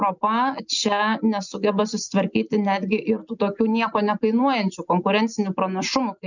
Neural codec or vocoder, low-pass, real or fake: none; 7.2 kHz; real